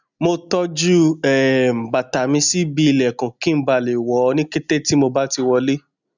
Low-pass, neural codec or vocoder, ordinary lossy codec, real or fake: 7.2 kHz; none; none; real